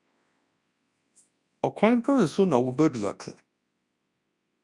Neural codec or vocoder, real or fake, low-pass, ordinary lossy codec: codec, 24 kHz, 0.9 kbps, WavTokenizer, large speech release; fake; 10.8 kHz; Opus, 64 kbps